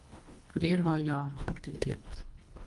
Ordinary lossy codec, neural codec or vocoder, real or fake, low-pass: Opus, 32 kbps; codec, 24 kHz, 1.5 kbps, HILCodec; fake; 10.8 kHz